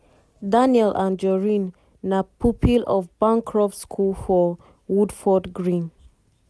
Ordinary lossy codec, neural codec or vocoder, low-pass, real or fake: none; none; none; real